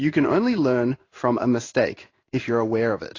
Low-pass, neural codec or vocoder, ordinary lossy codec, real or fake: 7.2 kHz; codec, 16 kHz in and 24 kHz out, 1 kbps, XY-Tokenizer; AAC, 32 kbps; fake